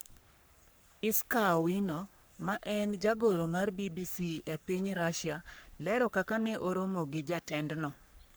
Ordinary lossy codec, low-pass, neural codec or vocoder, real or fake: none; none; codec, 44.1 kHz, 3.4 kbps, Pupu-Codec; fake